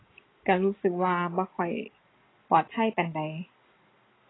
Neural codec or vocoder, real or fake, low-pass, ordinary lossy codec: none; real; 7.2 kHz; AAC, 16 kbps